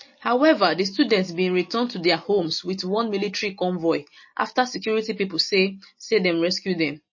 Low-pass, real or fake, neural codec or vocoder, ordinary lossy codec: 7.2 kHz; real; none; MP3, 32 kbps